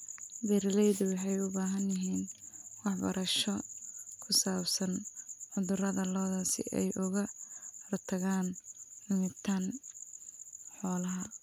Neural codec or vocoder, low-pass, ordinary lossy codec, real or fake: none; 19.8 kHz; none; real